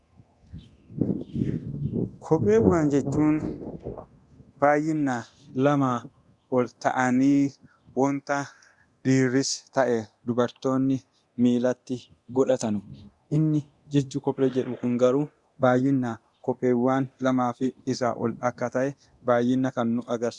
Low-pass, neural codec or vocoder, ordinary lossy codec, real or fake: 10.8 kHz; codec, 24 kHz, 0.9 kbps, DualCodec; Opus, 64 kbps; fake